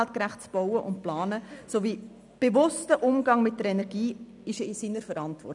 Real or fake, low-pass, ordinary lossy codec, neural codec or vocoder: real; 10.8 kHz; none; none